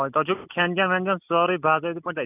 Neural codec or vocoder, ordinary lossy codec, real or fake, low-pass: none; none; real; 3.6 kHz